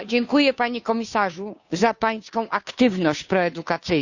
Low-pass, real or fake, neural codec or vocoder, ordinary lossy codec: 7.2 kHz; fake; codec, 16 kHz, 2 kbps, FunCodec, trained on Chinese and English, 25 frames a second; none